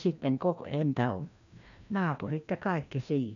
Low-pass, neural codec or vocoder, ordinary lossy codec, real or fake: 7.2 kHz; codec, 16 kHz, 1 kbps, FreqCodec, larger model; none; fake